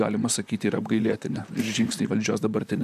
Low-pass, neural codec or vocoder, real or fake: 14.4 kHz; vocoder, 44.1 kHz, 128 mel bands, Pupu-Vocoder; fake